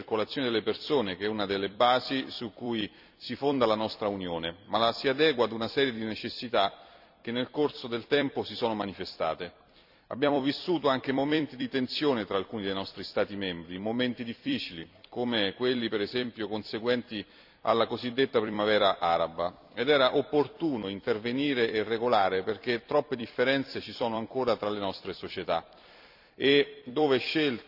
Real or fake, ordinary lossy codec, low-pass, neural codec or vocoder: fake; none; 5.4 kHz; vocoder, 44.1 kHz, 128 mel bands every 256 samples, BigVGAN v2